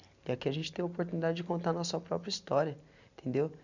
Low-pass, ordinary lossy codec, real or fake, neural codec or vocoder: 7.2 kHz; none; real; none